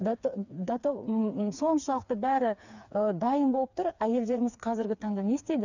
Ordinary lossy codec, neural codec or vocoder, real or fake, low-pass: none; codec, 16 kHz, 4 kbps, FreqCodec, smaller model; fake; 7.2 kHz